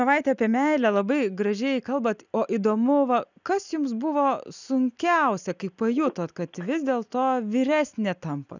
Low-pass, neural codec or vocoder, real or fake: 7.2 kHz; none; real